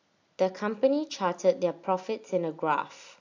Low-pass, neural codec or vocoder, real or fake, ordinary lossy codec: 7.2 kHz; none; real; AAC, 48 kbps